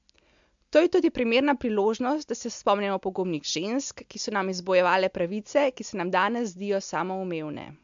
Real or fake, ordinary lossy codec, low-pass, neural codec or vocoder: real; MP3, 64 kbps; 7.2 kHz; none